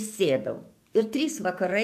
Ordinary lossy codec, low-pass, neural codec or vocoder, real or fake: AAC, 96 kbps; 14.4 kHz; codec, 44.1 kHz, 7.8 kbps, DAC; fake